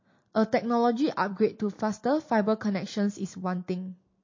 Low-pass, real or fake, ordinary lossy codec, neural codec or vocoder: 7.2 kHz; real; MP3, 32 kbps; none